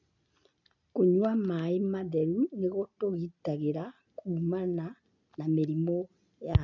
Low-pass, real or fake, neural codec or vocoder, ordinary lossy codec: 7.2 kHz; real; none; none